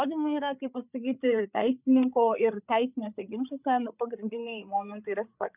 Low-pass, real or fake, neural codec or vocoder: 3.6 kHz; fake; codec, 16 kHz, 8 kbps, FreqCodec, larger model